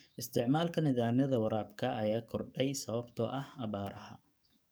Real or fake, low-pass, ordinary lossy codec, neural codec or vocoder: fake; none; none; codec, 44.1 kHz, 7.8 kbps, DAC